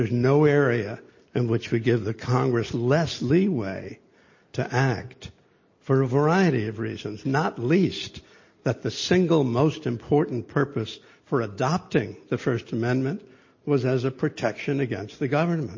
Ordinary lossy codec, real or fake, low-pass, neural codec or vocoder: MP3, 32 kbps; real; 7.2 kHz; none